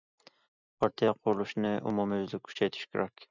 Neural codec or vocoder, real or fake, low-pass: none; real; 7.2 kHz